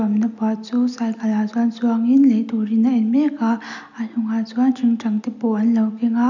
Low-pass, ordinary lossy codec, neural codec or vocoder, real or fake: 7.2 kHz; none; none; real